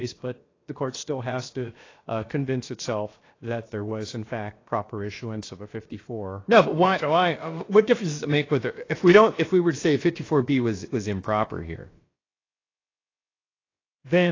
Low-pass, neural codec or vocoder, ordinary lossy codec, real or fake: 7.2 kHz; codec, 16 kHz, about 1 kbps, DyCAST, with the encoder's durations; AAC, 32 kbps; fake